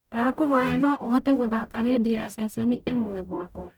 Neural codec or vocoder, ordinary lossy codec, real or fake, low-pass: codec, 44.1 kHz, 0.9 kbps, DAC; none; fake; 19.8 kHz